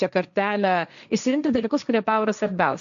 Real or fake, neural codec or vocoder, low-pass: fake; codec, 16 kHz, 1.1 kbps, Voila-Tokenizer; 7.2 kHz